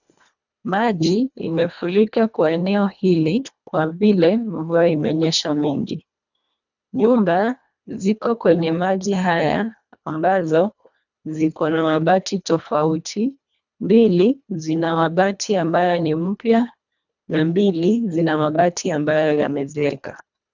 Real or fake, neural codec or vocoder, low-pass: fake; codec, 24 kHz, 1.5 kbps, HILCodec; 7.2 kHz